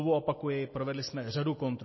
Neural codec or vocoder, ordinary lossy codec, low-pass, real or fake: none; MP3, 24 kbps; 7.2 kHz; real